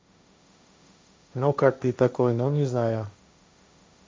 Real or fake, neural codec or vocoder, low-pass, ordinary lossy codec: fake; codec, 16 kHz, 1.1 kbps, Voila-Tokenizer; none; none